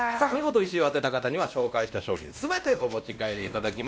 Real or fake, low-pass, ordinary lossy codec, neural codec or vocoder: fake; none; none; codec, 16 kHz, 1 kbps, X-Codec, WavLM features, trained on Multilingual LibriSpeech